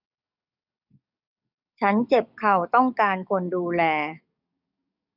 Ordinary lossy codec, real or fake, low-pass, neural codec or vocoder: none; fake; 5.4 kHz; codec, 44.1 kHz, 7.8 kbps, DAC